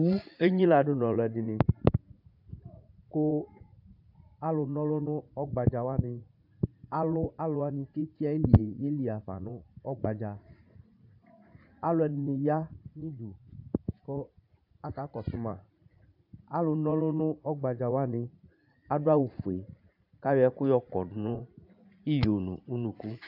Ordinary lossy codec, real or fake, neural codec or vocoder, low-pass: AAC, 48 kbps; fake; vocoder, 44.1 kHz, 80 mel bands, Vocos; 5.4 kHz